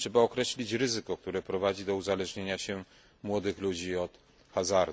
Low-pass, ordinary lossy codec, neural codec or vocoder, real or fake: none; none; none; real